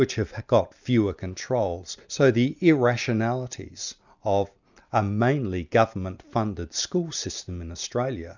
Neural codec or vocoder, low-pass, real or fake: none; 7.2 kHz; real